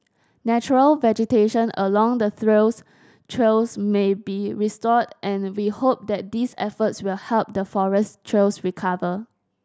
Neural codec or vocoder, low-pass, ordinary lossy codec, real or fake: none; none; none; real